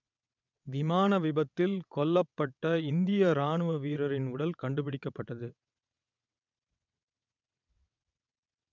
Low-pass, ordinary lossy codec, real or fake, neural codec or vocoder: 7.2 kHz; none; fake; vocoder, 22.05 kHz, 80 mel bands, WaveNeXt